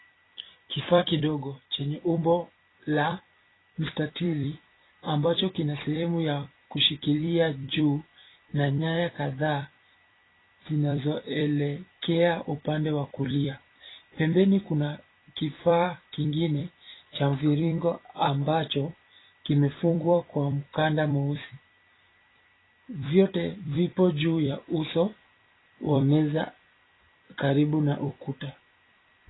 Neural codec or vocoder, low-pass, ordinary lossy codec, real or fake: vocoder, 44.1 kHz, 128 mel bands every 256 samples, BigVGAN v2; 7.2 kHz; AAC, 16 kbps; fake